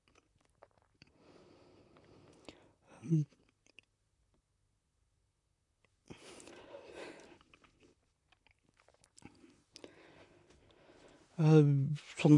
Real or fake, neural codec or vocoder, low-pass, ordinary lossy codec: fake; vocoder, 44.1 kHz, 128 mel bands, Pupu-Vocoder; 10.8 kHz; AAC, 64 kbps